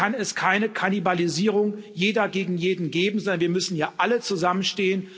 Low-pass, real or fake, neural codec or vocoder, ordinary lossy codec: none; real; none; none